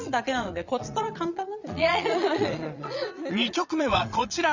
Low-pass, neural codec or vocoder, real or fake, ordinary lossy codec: 7.2 kHz; vocoder, 22.05 kHz, 80 mel bands, Vocos; fake; Opus, 64 kbps